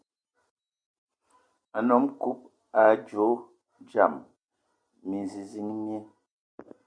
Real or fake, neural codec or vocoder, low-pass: real; none; 9.9 kHz